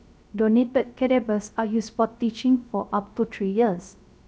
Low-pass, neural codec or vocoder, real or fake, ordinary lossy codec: none; codec, 16 kHz, 0.3 kbps, FocalCodec; fake; none